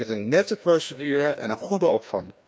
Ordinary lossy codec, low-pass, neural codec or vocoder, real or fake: none; none; codec, 16 kHz, 1 kbps, FreqCodec, larger model; fake